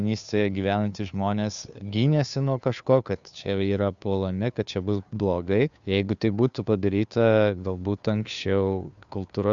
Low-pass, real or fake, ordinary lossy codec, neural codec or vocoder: 7.2 kHz; fake; Opus, 64 kbps; codec, 16 kHz, 2 kbps, FunCodec, trained on Chinese and English, 25 frames a second